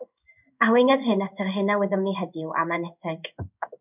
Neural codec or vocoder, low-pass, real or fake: codec, 16 kHz in and 24 kHz out, 1 kbps, XY-Tokenizer; 3.6 kHz; fake